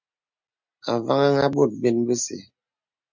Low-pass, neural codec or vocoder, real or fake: 7.2 kHz; none; real